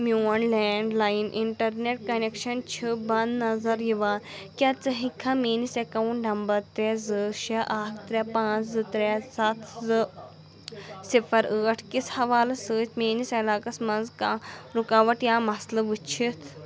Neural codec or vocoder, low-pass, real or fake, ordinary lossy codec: none; none; real; none